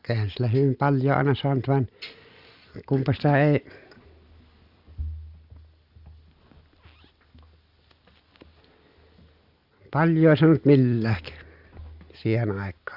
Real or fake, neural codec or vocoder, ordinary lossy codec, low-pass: real; none; Opus, 64 kbps; 5.4 kHz